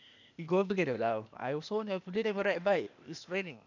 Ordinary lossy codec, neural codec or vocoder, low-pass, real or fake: none; codec, 16 kHz, 0.8 kbps, ZipCodec; 7.2 kHz; fake